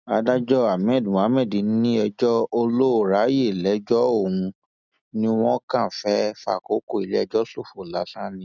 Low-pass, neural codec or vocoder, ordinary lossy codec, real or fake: 7.2 kHz; none; none; real